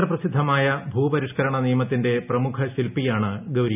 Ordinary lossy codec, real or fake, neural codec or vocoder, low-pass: none; real; none; 3.6 kHz